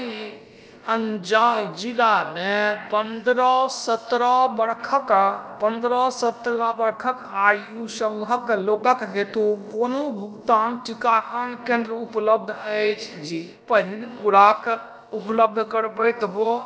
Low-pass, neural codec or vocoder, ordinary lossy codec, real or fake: none; codec, 16 kHz, about 1 kbps, DyCAST, with the encoder's durations; none; fake